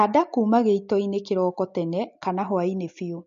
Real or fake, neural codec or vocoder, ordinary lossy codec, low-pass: real; none; MP3, 48 kbps; 7.2 kHz